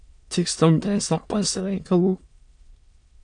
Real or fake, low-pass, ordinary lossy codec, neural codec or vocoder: fake; 9.9 kHz; AAC, 64 kbps; autoencoder, 22.05 kHz, a latent of 192 numbers a frame, VITS, trained on many speakers